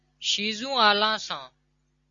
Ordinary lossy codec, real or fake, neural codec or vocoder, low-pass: Opus, 64 kbps; real; none; 7.2 kHz